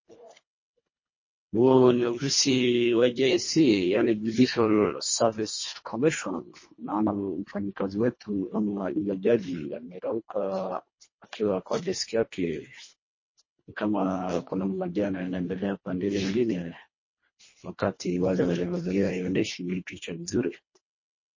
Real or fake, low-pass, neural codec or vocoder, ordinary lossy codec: fake; 7.2 kHz; codec, 24 kHz, 1.5 kbps, HILCodec; MP3, 32 kbps